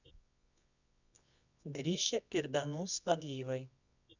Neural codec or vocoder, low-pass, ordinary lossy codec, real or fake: codec, 24 kHz, 0.9 kbps, WavTokenizer, medium music audio release; 7.2 kHz; none; fake